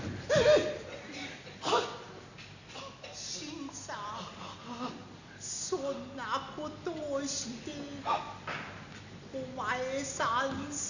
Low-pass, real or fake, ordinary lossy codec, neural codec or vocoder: 7.2 kHz; real; none; none